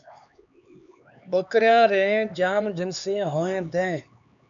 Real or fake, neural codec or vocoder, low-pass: fake; codec, 16 kHz, 4 kbps, X-Codec, HuBERT features, trained on LibriSpeech; 7.2 kHz